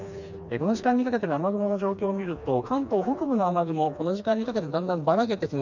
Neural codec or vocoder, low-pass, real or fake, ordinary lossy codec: codec, 16 kHz, 2 kbps, FreqCodec, smaller model; 7.2 kHz; fake; none